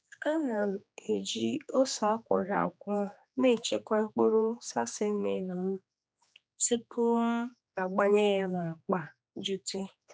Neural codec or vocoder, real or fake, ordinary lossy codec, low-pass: codec, 16 kHz, 2 kbps, X-Codec, HuBERT features, trained on general audio; fake; none; none